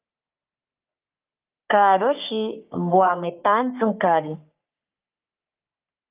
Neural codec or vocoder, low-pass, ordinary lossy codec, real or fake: codec, 44.1 kHz, 3.4 kbps, Pupu-Codec; 3.6 kHz; Opus, 24 kbps; fake